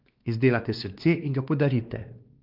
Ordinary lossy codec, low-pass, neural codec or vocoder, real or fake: Opus, 24 kbps; 5.4 kHz; codec, 16 kHz, 2 kbps, X-Codec, WavLM features, trained on Multilingual LibriSpeech; fake